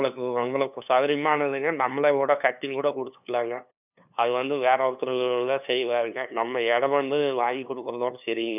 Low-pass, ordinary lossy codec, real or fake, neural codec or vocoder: 3.6 kHz; none; fake; codec, 16 kHz, 2 kbps, FunCodec, trained on LibriTTS, 25 frames a second